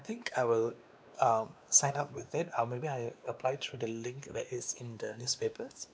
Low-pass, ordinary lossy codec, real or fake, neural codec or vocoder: none; none; fake; codec, 16 kHz, 2 kbps, X-Codec, WavLM features, trained on Multilingual LibriSpeech